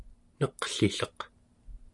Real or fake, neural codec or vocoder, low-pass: real; none; 10.8 kHz